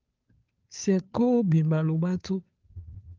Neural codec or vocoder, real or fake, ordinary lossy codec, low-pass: codec, 16 kHz, 2 kbps, FunCodec, trained on Chinese and English, 25 frames a second; fake; Opus, 32 kbps; 7.2 kHz